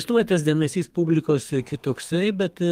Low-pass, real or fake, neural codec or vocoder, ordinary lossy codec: 14.4 kHz; fake; codec, 32 kHz, 1.9 kbps, SNAC; Opus, 32 kbps